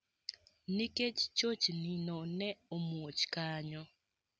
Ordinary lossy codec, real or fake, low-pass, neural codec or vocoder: none; real; none; none